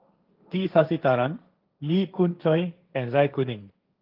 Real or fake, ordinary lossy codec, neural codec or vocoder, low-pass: fake; Opus, 32 kbps; codec, 16 kHz, 1.1 kbps, Voila-Tokenizer; 5.4 kHz